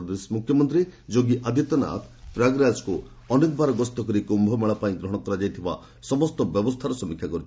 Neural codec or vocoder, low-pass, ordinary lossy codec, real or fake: none; none; none; real